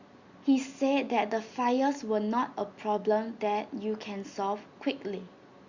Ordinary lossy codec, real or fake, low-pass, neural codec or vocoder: Opus, 64 kbps; real; 7.2 kHz; none